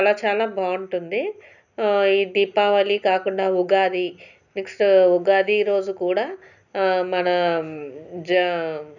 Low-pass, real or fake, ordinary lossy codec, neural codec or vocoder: 7.2 kHz; real; none; none